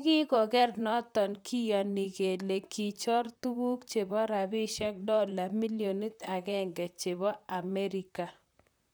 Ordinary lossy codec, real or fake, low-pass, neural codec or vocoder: none; fake; none; vocoder, 44.1 kHz, 128 mel bands, Pupu-Vocoder